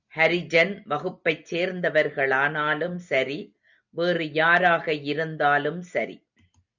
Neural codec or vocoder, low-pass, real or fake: none; 7.2 kHz; real